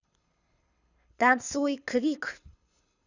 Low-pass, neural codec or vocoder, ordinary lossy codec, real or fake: 7.2 kHz; codec, 24 kHz, 6 kbps, HILCodec; none; fake